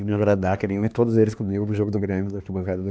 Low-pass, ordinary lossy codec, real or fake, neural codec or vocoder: none; none; fake; codec, 16 kHz, 2 kbps, X-Codec, HuBERT features, trained on LibriSpeech